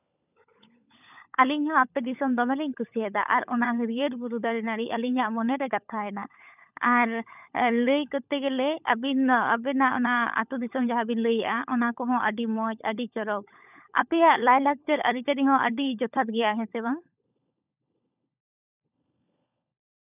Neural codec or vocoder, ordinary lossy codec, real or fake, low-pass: codec, 16 kHz, 16 kbps, FunCodec, trained on LibriTTS, 50 frames a second; none; fake; 3.6 kHz